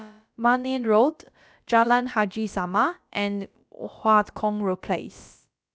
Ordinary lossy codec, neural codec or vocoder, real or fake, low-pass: none; codec, 16 kHz, about 1 kbps, DyCAST, with the encoder's durations; fake; none